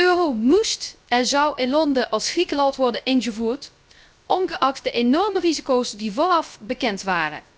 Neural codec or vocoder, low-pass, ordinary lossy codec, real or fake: codec, 16 kHz, 0.3 kbps, FocalCodec; none; none; fake